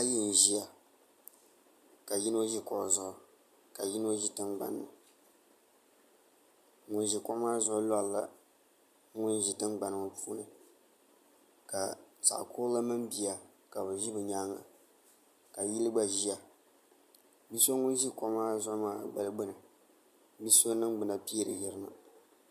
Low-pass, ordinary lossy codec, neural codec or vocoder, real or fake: 14.4 kHz; MP3, 96 kbps; none; real